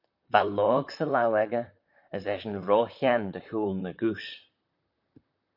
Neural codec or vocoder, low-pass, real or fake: vocoder, 44.1 kHz, 128 mel bands, Pupu-Vocoder; 5.4 kHz; fake